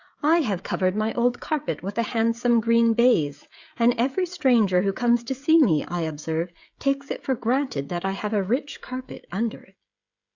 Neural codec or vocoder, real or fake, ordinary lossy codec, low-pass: codec, 16 kHz, 16 kbps, FreqCodec, smaller model; fake; Opus, 64 kbps; 7.2 kHz